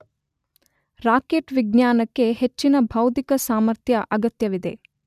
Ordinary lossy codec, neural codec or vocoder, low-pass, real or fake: none; none; 14.4 kHz; real